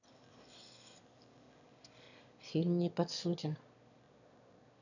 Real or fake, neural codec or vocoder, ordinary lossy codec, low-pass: fake; autoencoder, 22.05 kHz, a latent of 192 numbers a frame, VITS, trained on one speaker; none; 7.2 kHz